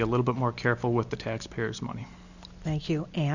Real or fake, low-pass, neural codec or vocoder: real; 7.2 kHz; none